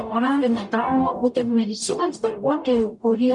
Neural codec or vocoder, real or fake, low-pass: codec, 44.1 kHz, 0.9 kbps, DAC; fake; 10.8 kHz